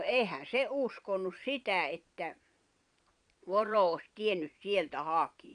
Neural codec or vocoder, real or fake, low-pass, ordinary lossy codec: vocoder, 22.05 kHz, 80 mel bands, Vocos; fake; 9.9 kHz; none